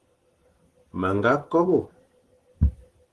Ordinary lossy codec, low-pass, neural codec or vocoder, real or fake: Opus, 16 kbps; 10.8 kHz; vocoder, 44.1 kHz, 128 mel bands every 512 samples, BigVGAN v2; fake